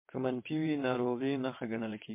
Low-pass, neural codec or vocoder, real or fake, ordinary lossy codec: 3.6 kHz; vocoder, 22.05 kHz, 80 mel bands, WaveNeXt; fake; MP3, 24 kbps